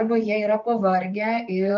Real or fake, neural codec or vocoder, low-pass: fake; vocoder, 44.1 kHz, 128 mel bands, Pupu-Vocoder; 7.2 kHz